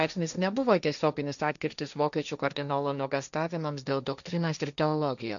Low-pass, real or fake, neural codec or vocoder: 7.2 kHz; fake; codec, 16 kHz, 1.1 kbps, Voila-Tokenizer